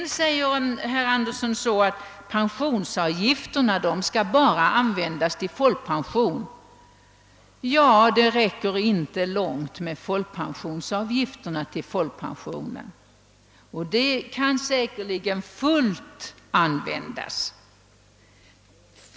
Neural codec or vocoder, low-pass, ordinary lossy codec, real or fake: none; none; none; real